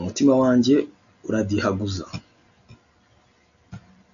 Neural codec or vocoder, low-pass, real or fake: none; 7.2 kHz; real